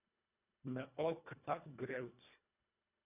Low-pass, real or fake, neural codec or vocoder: 3.6 kHz; fake; codec, 24 kHz, 1.5 kbps, HILCodec